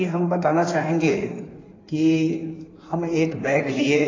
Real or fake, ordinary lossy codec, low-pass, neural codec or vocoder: fake; AAC, 32 kbps; 7.2 kHz; codec, 16 kHz in and 24 kHz out, 1.1 kbps, FireRedTTS-2 codec